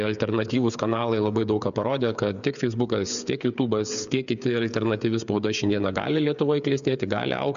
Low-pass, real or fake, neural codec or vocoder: 7.2 kHz; fake; codec, 16 kHz, 8 kbps, FreqCodec, smaller model